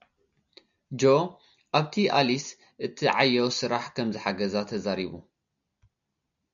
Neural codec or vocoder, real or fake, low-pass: none; real; 7.2 kHz